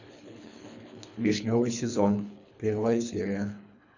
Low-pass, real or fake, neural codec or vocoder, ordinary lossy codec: 7.2 kHz; fake; codec, 24 kHz, 3 kbps, HILCodec; none